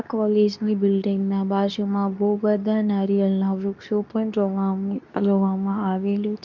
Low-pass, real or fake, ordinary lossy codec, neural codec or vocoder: 7.2 kHz; fake; none; codec, 24 kHz, 0.9 kbps, WavTokenizer, medium speech release version 2